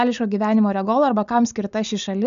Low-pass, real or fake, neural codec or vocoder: 7.2 kHz; real; none